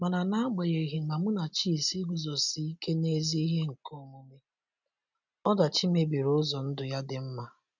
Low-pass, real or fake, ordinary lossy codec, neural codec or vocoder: 7.2 kHz; real; none; none